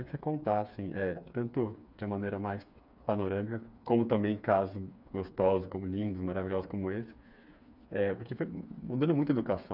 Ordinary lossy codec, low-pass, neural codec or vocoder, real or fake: none; 5.4 kHz; codec, 16 kHz, 4 kbps, FreqCodec, smaller model; fake